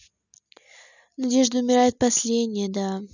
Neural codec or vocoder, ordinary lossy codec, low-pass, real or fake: none; none; 7.2 kHz; real